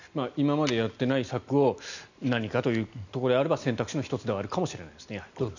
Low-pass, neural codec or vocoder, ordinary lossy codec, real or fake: 7.2 kHz; none; none; real